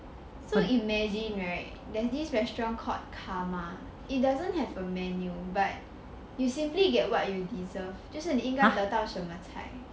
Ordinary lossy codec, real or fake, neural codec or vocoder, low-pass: none; real; none; none